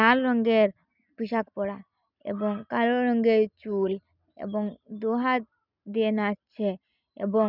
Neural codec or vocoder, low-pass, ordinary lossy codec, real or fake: none; 5.4 kHz; none; real